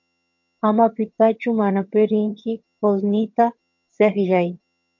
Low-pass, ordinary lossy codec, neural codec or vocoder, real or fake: 7.2 kHz; MP3, 48 kbps; vocoder, 22.05 kHz, 80 mel bands, HiFi-GAN; fake